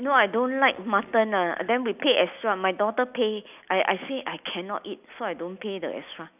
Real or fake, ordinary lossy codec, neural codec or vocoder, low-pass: real; none; none; 3.6 kHz